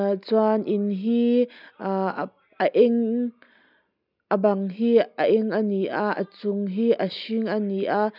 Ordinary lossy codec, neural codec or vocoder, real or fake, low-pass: none; none; real; 5.4 kHz